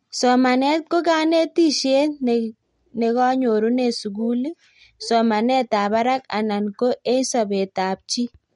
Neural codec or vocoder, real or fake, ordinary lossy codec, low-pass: none; real; MP3, 48 kbps; 10.8 kHz